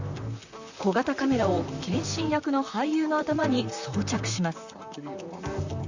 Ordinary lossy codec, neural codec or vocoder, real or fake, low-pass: Opus, 64 kbps; vocoder, 44.1 kHz, 128 mel bands, Pupu-Vocoder; fake; 7.2 kHz